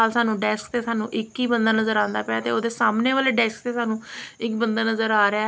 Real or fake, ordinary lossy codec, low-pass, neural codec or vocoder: real; none; none; none